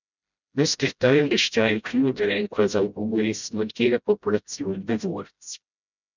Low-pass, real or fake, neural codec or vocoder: 7.2 kHz; fake; codec, 16 kHz, 0.5 kbps, FreqCodec, smaller model